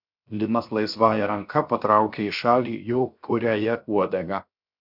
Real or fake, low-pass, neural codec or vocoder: fake; 5.4 kHz; codec, 16 kHz, 0.7 kbps, FocalCodec